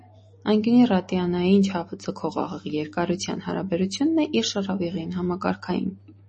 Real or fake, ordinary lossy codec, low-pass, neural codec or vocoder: real; MP3, 32 kbps; 10.8 kHz; none